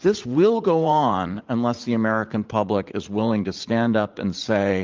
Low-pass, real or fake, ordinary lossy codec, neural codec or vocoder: 7.2 kHz; fake; Opus, 24 kbps; vocoder, 44.1 kHz, 80 mel bands, Vocos